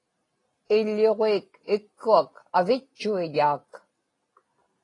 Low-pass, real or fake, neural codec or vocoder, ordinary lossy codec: 10.8 kHz; real; none; AAC, 32 kbps